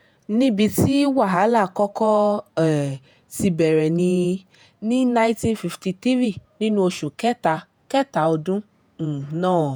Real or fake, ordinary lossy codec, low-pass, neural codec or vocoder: fake; none; none; vocoder, 48 kHz, 128 mel bands, Vocos